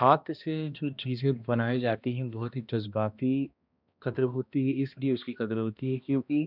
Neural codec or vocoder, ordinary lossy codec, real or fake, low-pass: codec, 16 kHz, 1 kbps, X-Codec, HuBERT features, trained on balanced general audio; none; fake; 5.4 kHz